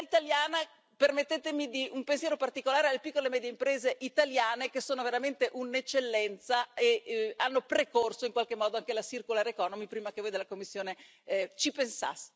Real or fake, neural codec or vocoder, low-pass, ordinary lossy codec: real; none; none; none